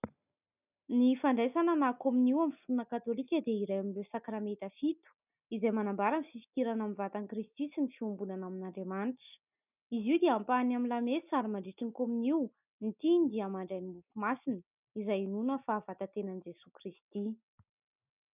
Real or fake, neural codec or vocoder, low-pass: real; none; 3.6 kHz